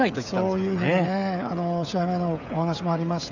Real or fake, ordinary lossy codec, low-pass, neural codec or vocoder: fake; none; 7.2 kHz; codec, 16 kHz, 16 kbps, FreqCodec, smaller model